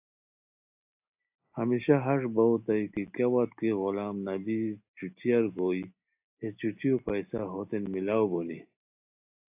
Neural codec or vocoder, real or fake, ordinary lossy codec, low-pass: none; real; AAC, 32 kbps; 3.6 kHz